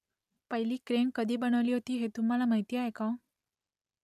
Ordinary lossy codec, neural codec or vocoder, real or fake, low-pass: none; none; real; 14.4 kHz